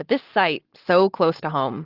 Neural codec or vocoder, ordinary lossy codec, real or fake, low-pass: none; Opus, 16 kbps; real; 5.4 kHz